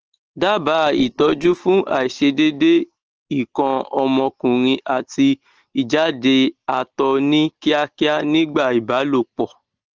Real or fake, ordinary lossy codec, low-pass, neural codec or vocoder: real; Opus, 16 kbps; 7.2 kHz; none